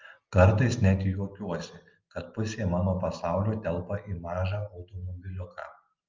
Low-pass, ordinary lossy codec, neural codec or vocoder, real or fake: 7.2 kHz; Opus, 32 kbps; none; real